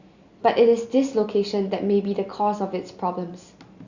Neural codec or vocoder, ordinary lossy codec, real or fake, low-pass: none; Opus, 64 kbps; real; 7.2 kHz